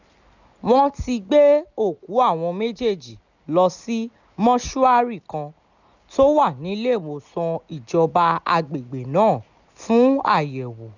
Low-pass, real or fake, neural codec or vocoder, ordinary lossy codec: 7.2 kHz; real; none; none